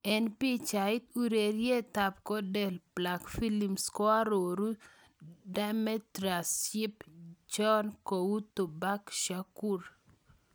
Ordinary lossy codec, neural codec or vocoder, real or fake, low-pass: none; none; real; none